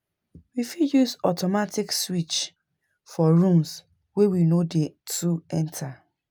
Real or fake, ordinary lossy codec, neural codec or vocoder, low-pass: real; none; none; none